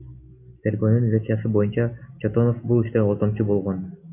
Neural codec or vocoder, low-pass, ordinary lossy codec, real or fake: none; 3.6 kHz; AAC, 32 kbps; real